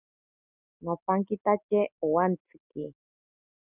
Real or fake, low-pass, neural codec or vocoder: real; 3.6 kHz; none